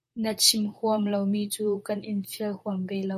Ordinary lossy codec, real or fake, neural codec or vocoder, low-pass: MP3, 64 kbps; fake; vocoder, 44.1 kHz, 128 mel bands, Pupu-Vocoder; 14.4 kHz